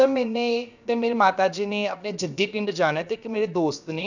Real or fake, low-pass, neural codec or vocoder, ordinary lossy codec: fake; 7.2 kHz; codec, 16 kHz, about 1 kbps, DyCAST, with the encoder's durations; none